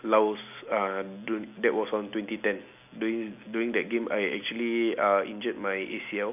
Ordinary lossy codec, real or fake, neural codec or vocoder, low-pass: none; real; none; 3.6 kHz